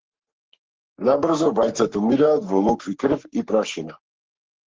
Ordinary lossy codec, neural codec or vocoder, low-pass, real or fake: Opus, 16 kbps; codec, 44.1 kHz, 3.4 kbps, Pupu-Codec; 7.2 kHz; fake